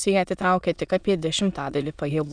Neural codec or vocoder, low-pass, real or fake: autoencoder, 22.05 kHz, a latent of 192 numbers a frame, VITS, trained on many speakers; 9.9 kHz; fake